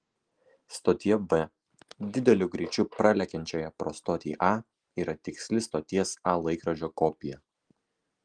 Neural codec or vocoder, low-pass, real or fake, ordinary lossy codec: none; 9.9 kHz; real; Opus, 24 kbps